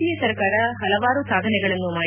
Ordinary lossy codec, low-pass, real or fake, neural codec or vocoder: none; 3.6 kHz; real; none